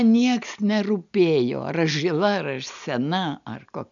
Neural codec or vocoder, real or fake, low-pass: none; real; 7.2 kHz